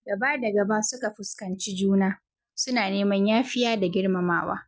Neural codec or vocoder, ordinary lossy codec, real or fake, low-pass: none; none; real; none